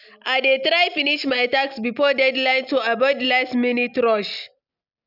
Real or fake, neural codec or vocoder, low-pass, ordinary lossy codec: real; none; 5.4 kHz; none